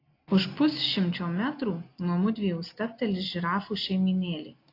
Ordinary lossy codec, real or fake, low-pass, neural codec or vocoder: AAC, 32 kbps; real; 5.4 kHz; none